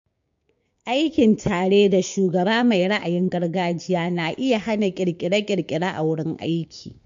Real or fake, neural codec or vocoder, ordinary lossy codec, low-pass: fake; codec, 16 kHz, 6 kbps, DAC; MP3, 48 kbps; 7.2 kHz